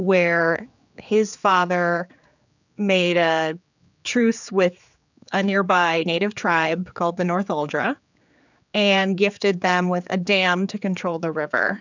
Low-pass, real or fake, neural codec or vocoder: 7.2 kHz; fake; codec, 16 kHz, 4 kbps, X-Codec, HuBERT features, trained on general audio